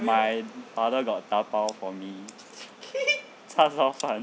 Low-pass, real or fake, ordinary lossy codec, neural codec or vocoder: none; real; none; none